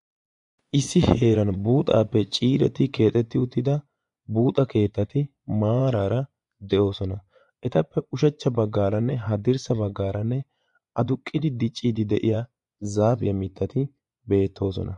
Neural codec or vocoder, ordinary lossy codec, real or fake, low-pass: vocoder, 24 kHz, 100 mel bands, Vocos; MP3, 64 kbps; fake; 10.8 kHz